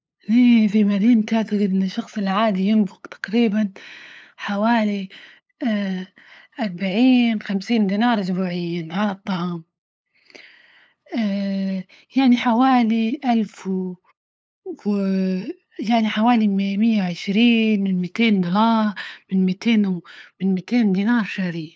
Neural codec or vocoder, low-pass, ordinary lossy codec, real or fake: codec, 16 kHz, 8 kbps, FunCodec, trained on LibriTTS, 25 frames a second; none; none; fake